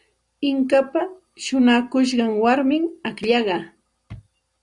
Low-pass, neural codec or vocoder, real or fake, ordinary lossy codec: 10.8 kHz; none; real; Opus, 64 kbps